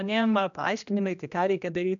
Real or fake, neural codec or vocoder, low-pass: fake; codec, 16 kHz, 1 kbps, X-Codec, HuBERT features, trained on general audio; 7.2 kHz